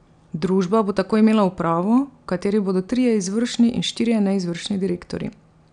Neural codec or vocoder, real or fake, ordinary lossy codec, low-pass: none; real; none; 9.9 kHz